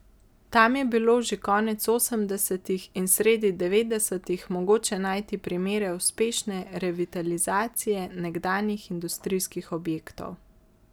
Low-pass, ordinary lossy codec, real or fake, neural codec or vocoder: none; none; real; none